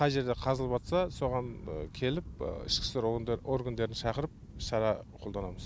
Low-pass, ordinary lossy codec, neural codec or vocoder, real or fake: none; none; none; real